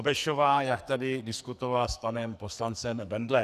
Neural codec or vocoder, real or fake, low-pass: codec, 44.1 kHz, 2.6 kbps, SNAC; fake; 14.4 kHz